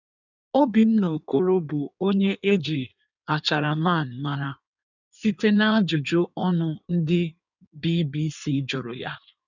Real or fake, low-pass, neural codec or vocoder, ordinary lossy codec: fake; 7.2 kHz; codec, 16 kHz in and 24 kHz out, 1.1 kbps, FireRedTTS-2 codec; none